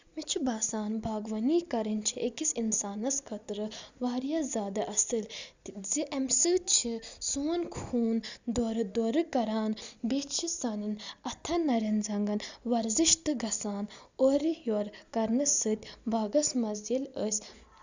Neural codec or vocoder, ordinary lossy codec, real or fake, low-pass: none; Opus, 64 kbps; real; 7.2 kHz